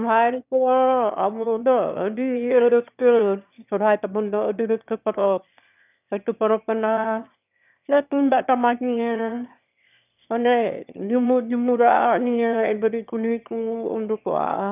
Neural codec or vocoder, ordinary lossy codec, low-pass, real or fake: autoencoder, 22.05 kHz, a latent of 192 numbers a frame, VITS, trained on one speaker; none; 3.6 kHz; fake